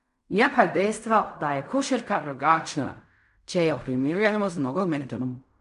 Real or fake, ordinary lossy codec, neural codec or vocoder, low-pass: fake; none; codec, 16 kHz in and 24 kHz out, 0.4 kbps, LongCat-Audio-Codec, fine tuned four codebook decoder; 10.8 kHz